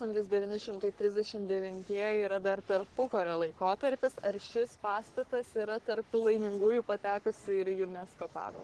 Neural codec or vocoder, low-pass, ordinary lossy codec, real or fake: codec, 44.1 kHz, 3.4 kbps, Pupu-Codec; 10.8 kHz; Opus, 16 kbps; fake